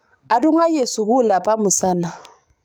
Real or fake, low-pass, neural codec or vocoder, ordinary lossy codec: fake; none; codec, 44.1 kHz, 7.8 kbps, DAC; none